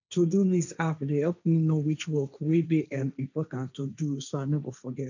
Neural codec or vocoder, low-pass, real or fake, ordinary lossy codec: codec, 16 kHz, 1.1 kbps, Voila-Tokenizer; 7.2 kHz; fake; none